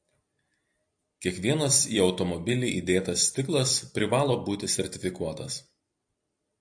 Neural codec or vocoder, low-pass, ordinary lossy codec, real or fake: vocoder, 44.1 kHz, 128 mel bands every 256 samples, BigVGAN v2; 9.9 kHz; AAC, 64 kbps; fake